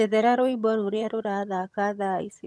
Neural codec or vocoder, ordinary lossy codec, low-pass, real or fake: vocoder, 22.05 kHz, 80 mel bands, HiFi-GAN; none; none; fake